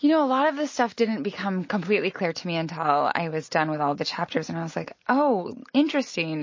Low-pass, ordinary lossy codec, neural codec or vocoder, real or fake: 7.2 kHz; MP3, 32 kbps; none; real